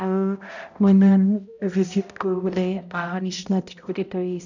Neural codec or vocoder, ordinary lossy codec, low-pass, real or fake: codec, 16 kHz, 0.5 kbps, X-Codec, HuBERT features, trained on balanced general audio; none; 7.2 kHz; fake